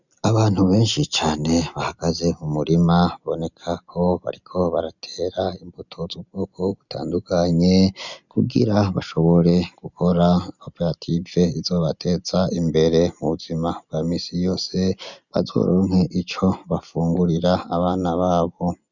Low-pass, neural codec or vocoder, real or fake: 7.2 kHz; none; real